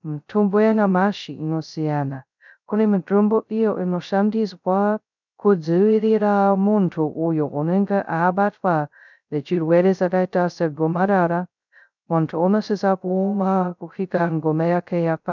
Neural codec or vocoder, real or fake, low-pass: codec, 16 kHz, 0.2 kbps, FocalCodec; fake; 7.2 kHz